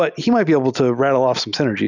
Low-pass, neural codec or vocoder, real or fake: 7.2 kHz; none; real